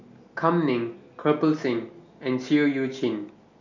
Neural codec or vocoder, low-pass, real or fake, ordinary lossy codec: none; 7.2 kHz; real; none